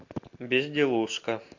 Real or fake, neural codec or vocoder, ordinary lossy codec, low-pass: real; none; AAC, 48 kbps; 7.2 kHz